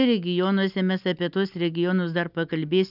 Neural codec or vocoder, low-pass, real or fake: none; 5.4 kHz; real